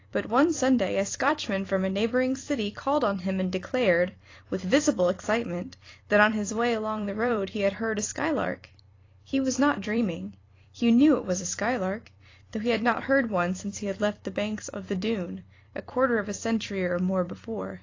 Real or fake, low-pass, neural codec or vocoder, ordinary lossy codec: fake; 7.2 kHz; vocoder, 44.1 kHz, 128 mel bands every 256 samples, BigVGAN v2; AAC, 32 kbps